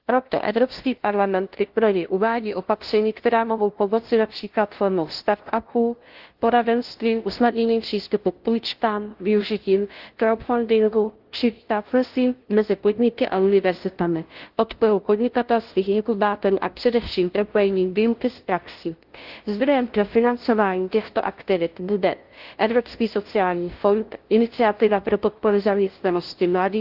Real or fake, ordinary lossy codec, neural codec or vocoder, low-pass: fake; Opus, 16 kbps; codec, 16 kHz, 0.5 kbps, FunCodec, trained on LibriTTS, 25 frames a second; 5.4 kHz